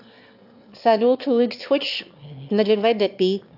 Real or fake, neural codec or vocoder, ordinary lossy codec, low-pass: fake; autoencoder, 22.05 kHz, a latent of 192 numbers a frame, VITS, trained on one speaker; AAC, 48 kbps; 5.4 kHz